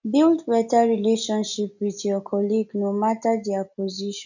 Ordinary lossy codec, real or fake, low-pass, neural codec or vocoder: none; real; 7.2 kHz; none